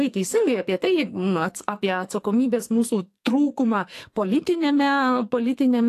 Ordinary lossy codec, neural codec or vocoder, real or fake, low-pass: AAC, 64 kbps; codec, 44.1 kHz, 2.6 kbps, SNAC; fake; 14.4 kHz